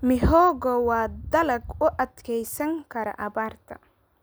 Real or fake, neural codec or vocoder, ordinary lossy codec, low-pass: real; none; none; none